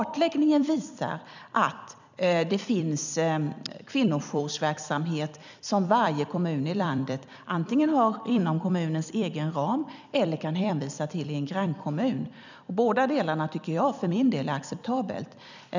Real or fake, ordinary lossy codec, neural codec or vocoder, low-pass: fake; none; vocoder, 44.1 kHz, 128 mel bands every 256 samples, BigVGAN v2; 7.2 kHz